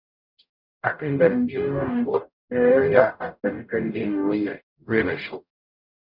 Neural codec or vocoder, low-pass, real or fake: codec, 44.1 kHz, 0.9 kbps, DAC; 5.4 kHz; fake